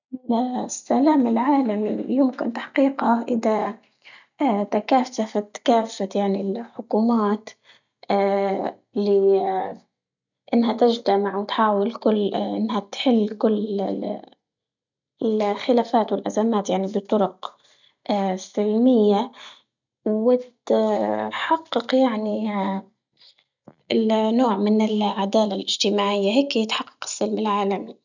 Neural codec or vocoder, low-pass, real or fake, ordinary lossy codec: none; 7.2 kHz; real; none